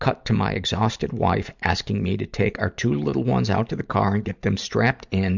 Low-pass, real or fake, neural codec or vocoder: 7.2 kHz; fake; vocoder, 44.1 kHz, 128 mel bands every 512 samples, BigVGAN v2